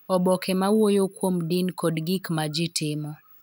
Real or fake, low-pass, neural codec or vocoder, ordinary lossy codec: real; none; none; none